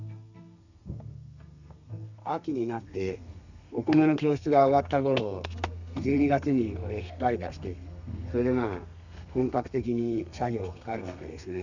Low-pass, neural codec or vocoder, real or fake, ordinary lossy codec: 7.2 kHz; codec, 32 kHz, 1.9 kbps, SNAC; fake; none